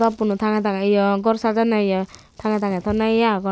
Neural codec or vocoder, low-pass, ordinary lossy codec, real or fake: none; none; none; real